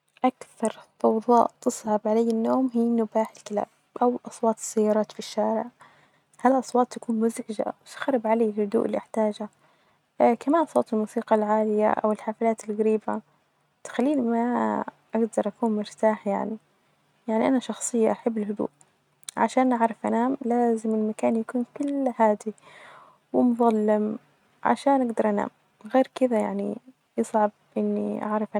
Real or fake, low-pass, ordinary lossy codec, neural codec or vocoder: real; 19.8 kHz; none; none